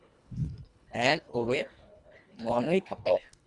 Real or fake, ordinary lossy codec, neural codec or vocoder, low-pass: fake; Opus, 64 kbps; codec, 24 kHz, 1.5 kbps, HILCodec; 10.8 kHz